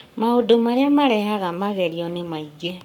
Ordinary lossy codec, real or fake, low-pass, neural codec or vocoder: none; fake; 19.8 kHz; codec, 44.1 kHz, 7.8 kbps, Pupu-Codec